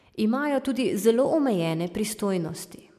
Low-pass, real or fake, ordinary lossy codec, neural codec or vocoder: 14.4 kHz; real; MP3, 96 kbps; none